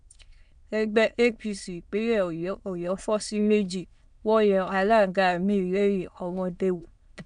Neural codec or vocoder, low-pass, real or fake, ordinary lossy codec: autoencoder, 22.05 kHz, a latent of 192 numbers a frame, VITS, trained on many speakers; 9.9 kHz; fake; none